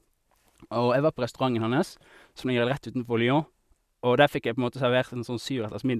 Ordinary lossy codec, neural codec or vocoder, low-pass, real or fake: none; none; 14.4 kHz; real